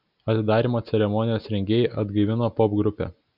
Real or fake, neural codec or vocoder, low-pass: real; none; 5.4 kHz